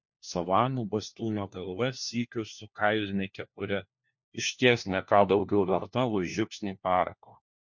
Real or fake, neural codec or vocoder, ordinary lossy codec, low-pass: fake; codec, 16 kHz, 1 kbps, FunCodec, trained on LibriTTS, 50 frames a second; MP3, 48 kbps; 7.2 kHz